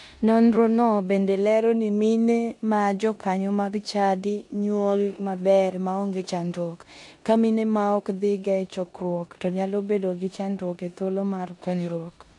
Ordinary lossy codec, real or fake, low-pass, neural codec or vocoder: AAC, 64 kbps; fake; 10.8 kHz; codec, 16 kHz in and 24 kHz out, 0.9 kbps, LongCat-Audio-Codec, four codebook decoder